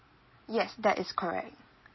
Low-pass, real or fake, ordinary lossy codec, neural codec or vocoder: 7.2 kHz; real; MP3, 24 kbps; none